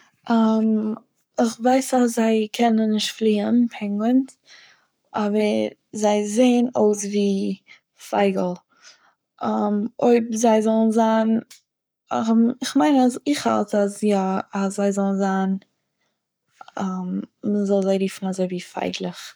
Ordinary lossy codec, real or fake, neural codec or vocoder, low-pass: none; fake; codec, 44.1 kHz, 7.8 kbps, Pupu-Codec; none